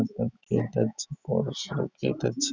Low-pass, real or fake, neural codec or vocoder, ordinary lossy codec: none; real; none; none